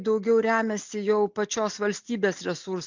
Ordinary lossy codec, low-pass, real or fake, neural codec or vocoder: MP3, 64 kbps; 7.2 kHz; real; none